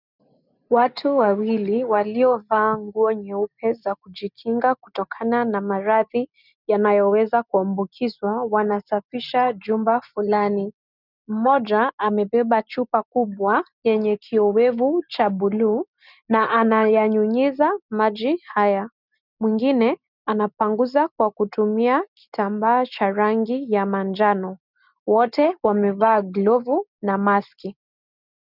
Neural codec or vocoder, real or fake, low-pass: none; real; 5.4 kHz